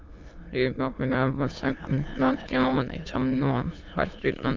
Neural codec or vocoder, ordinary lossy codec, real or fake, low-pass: autoencoder, 22.05 kHz, a latent of 192 numbers a frame, VITS, trained on many speakers; Opus, 24 kbps; fake; 7.2 kHz